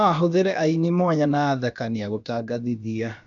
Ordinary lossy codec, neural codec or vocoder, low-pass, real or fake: none; codec, 16 kHz, about 1 kbps, DyCAST, with the encoder's durations; 7.2 kHz; fake